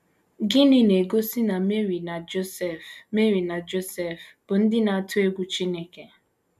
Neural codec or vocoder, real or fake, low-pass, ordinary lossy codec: none; real; 14.4 kHz; none